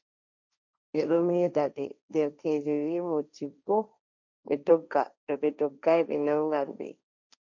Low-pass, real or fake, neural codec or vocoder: 7.2 kHz; fake; codec, 16 kHz, 1.1 kbps, Voila-Tokenizer